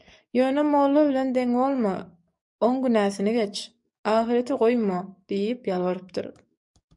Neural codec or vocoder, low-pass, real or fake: codec, 44.1 kHz, 7.8 kbps, DAC; 10.8 kHz; fake